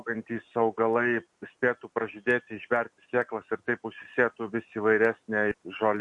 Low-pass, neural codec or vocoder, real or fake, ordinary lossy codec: 10.8 kHz; none; real; MP3, 48 kbps